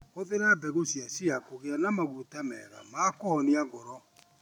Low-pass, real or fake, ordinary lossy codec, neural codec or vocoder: 19.8 kHz; real; none; none